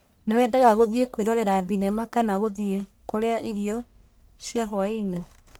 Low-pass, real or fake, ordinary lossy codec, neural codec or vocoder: none; fake; none; codec, 44.1 kHz, 1.7 kbps, Pupu-Codec